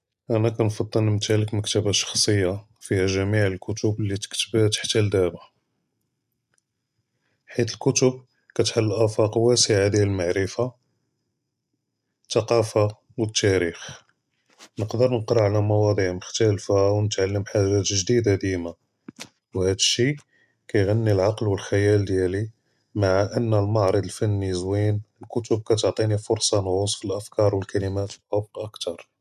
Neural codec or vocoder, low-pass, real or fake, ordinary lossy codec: none; 14.4 kHz; real; MP3, 96 kbps